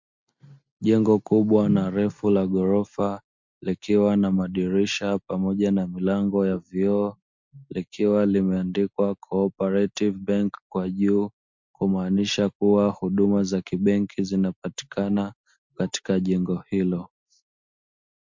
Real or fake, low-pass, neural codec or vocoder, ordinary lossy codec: real; 7.2 kHz; none; MP3, 48 kbps